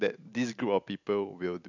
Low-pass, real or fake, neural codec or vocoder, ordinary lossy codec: 7.2 kHz; real; none; none